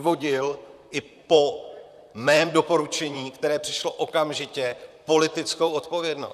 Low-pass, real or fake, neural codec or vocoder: 14.4 kHz; fake; vocoder, 44.1 kHz, 128 mel bands, Pupu-Vocoder